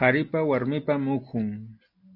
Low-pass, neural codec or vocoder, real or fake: 5.4 kHz; none; real